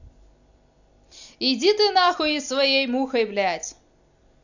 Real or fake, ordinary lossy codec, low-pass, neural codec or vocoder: real; none; 7.2 kHz; none